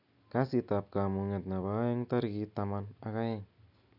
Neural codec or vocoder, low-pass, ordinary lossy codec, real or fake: none; 5.4 kHz; none; real